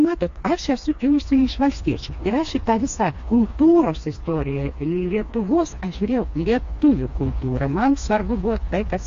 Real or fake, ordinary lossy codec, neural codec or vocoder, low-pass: fake; AAC, 48 kbps; codec, 16 kHz, 2 kbps, FreqCodec, smaller model; 7.2 kHz